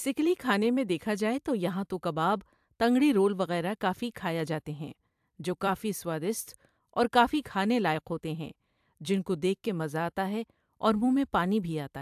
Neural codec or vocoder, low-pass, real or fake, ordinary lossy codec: vocoder, 44.1 kHz, 128 mel bands every 256 samples, BigVGAN v2; 14.4 kHz; fake; AAC, 96 kbps